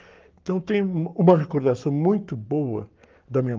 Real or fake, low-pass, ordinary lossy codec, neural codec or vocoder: fake; 7.2 kHz; Opus, 32 kbps; codec, 44.1 kHz, 7.8 kbps, Pupu-Codec